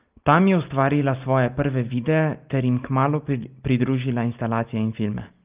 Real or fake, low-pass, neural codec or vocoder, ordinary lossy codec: real; 3.6 kHz; none; Opus, 24 kbps